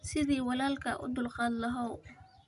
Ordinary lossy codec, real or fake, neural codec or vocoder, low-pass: none; real; none; 10.8 kHz